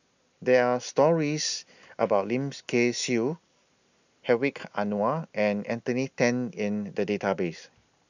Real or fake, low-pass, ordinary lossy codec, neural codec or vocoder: real; 7.2 kHz; none; none